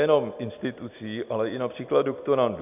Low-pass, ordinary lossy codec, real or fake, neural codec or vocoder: 3.6 kHz; AAC, 32 kbps; real; none